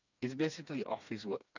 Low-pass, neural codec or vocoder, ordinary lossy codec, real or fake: 7.2 kHz; codec, 16 kHz, 2 kbps, FreqCodec, smaller model; none; fake